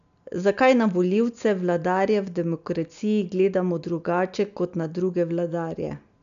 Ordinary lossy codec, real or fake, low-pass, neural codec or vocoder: AAC, 96 kbps; real; 7.2 kHz; none